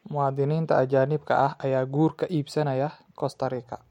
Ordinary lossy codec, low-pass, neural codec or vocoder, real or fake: MP3, 64 kbps; 19.8 kHz; none; real